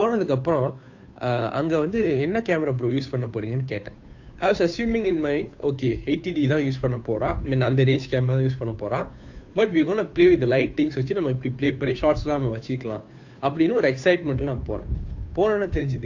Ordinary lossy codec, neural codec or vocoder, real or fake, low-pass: AAC, 48 kbps; codec, 16 kHz, 2 kbps, FunCodec, trained on Chinese and English, 25 frames a second; fake; 7.2 kHz